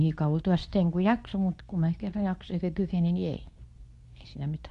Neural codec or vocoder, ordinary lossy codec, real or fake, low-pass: codec, 24 kHz, 0.9 kbps, WavTokenizer, medium speech release version 2; MP3, 64 kbps; fake; 10.8 kHz